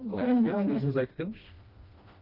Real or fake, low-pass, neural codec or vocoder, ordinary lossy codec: fake; 5.4 kHz; codec, 16 kHz, 1 kbps, FreqCodec, smaller model; Opus, 32 kbps